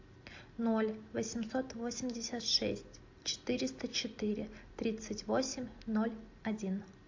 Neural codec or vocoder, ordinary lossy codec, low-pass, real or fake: none; AAC, 48 kbps; 7.2 kHz; real